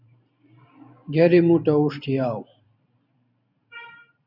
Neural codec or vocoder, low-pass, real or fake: vocoder, 44.1 kHz, 128 mel bands every 512 samples, BigVGAN v2; 5.4 kHz; fake